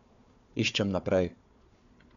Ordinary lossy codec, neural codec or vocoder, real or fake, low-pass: none; codec, 16 kHz, 4 kbps, FunCodec, trained on Chinese and English, 50 frames a second; fake; 7.2 kHz